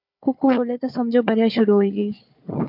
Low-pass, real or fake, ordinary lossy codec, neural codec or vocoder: 5.4 kHz; fake; MP3, 48 kbps; codec, 16 kHz, 4 kbps, FunCodec, trained on Chinese and English, 50 frames a second